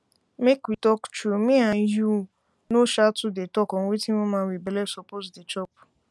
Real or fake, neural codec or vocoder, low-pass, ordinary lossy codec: real; none; none; none